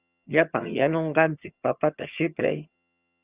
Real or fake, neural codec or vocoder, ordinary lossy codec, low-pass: fake; vocoder, 22.05 kHz, 80 mel bands, HiFi-GAN; Opus, 64 kbps; 3.6 kHz